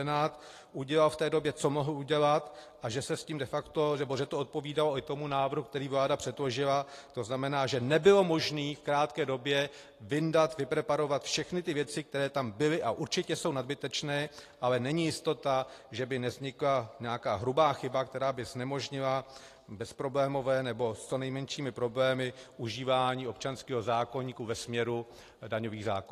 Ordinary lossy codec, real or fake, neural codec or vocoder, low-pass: AAC, 48 kbps; real; none; 14.4 kHz